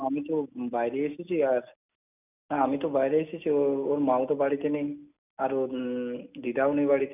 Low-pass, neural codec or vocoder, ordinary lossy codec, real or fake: 3.6 kHz; none; none; real